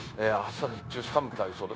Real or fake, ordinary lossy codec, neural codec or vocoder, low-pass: fake; none; codec, 16 kHz, 0.9 kbps, LongCat-Audio-Codec; none